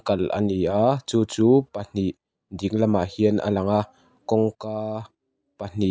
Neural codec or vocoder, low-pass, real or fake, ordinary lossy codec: none; none; real; none